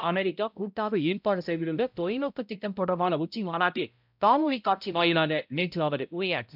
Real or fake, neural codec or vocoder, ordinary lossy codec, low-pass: fake; codec, 16 kHz, 0.5 kbps, X-Codec, HuBERT features, trained on balanced general audio; none; 5.4 kHz